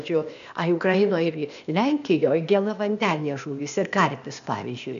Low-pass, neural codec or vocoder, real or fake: 7.2 kHz; codec, 16 kHz, 0.8 kbps, ZipCodec; fake